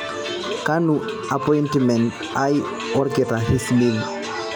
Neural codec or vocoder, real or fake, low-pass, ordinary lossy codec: none; real; none; none